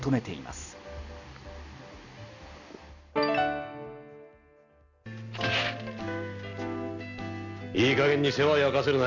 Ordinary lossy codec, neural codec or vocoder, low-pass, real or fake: none; none; 7.2 kHz; real